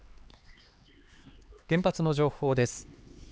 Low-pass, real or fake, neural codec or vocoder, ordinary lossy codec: none; fake; codec, 16 kHz, 4 kbps, X-Codec, HuBERT features, trained on LibriSpeech; none